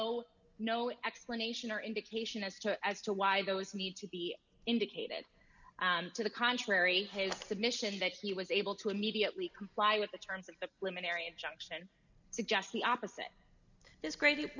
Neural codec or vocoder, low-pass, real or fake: none; 7.2 kHz; real